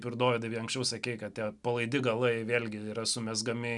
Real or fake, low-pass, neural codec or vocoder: real; 10.8 kHz; none